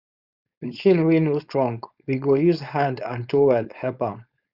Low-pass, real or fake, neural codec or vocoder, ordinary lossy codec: 5.4 kHz; fake; codec, 16 kHz, 4.8 kbps, FACodec; Opus, 64 kbps